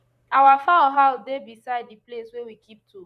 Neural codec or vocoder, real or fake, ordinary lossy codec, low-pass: vocoder, 44.1 kHz, 128 mel bands, Pupu-Vocoder; fake; none; 14.4 kHz